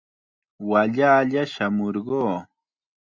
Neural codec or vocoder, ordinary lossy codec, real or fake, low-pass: none; Opus, 64 kbps; real; 7.2 kHz